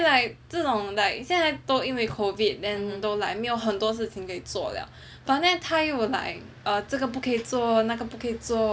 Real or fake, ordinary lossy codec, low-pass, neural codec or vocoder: real; none; none; none